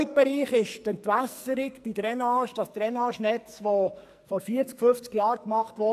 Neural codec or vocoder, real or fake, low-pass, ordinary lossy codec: codec, 44.1 kHz, 2.6 kbps, SNAC; fake; 14.4 kHz; AAC, 96 kbps